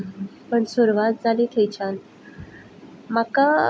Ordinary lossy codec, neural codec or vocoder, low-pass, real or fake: none; none; none; real